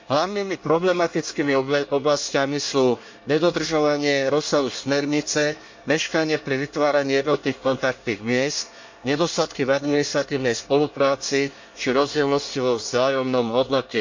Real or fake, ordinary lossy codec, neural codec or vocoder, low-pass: fake; MP3, 48 kbps; codec, 24 kHz, 1 kbps, SNAC; 7.2 kHz